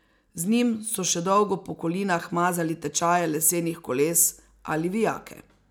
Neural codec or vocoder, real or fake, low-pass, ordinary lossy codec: none; real; none; none